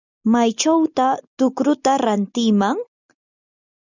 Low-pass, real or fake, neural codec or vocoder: 7.2 kHz; real; none